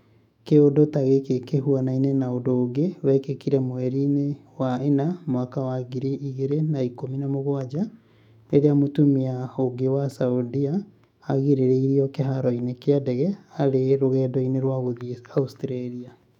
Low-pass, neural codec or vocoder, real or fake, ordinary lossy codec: 19.8 kHz; autoencoder, 48 kHz, 128 numbers a frame, DAC-VAE, trained on Japanese speech; fake; none